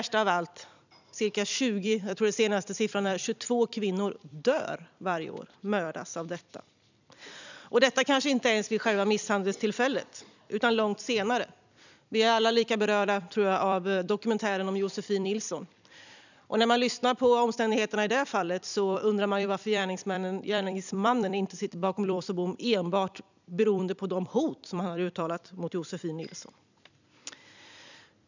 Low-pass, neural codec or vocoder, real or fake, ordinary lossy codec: 7.2 kHz; vocoder, 44.1 kHz, 128 mel bands every 256 samples, BigVGAN v2; fake; none